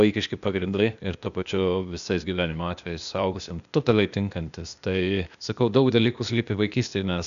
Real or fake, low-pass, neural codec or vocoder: fake; 7.2 kHz; codec, 16 kHz, 0.8 kbps, ZipCodec